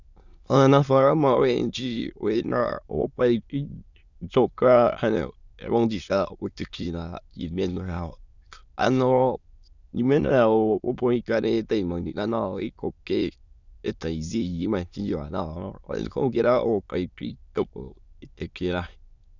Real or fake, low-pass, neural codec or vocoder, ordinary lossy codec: fake; 7.2 kHz; autoencoder, 22.05 kHz, a latent of 192 numbers a frame, VITS, trained on many speakers; Opus, 64 kbps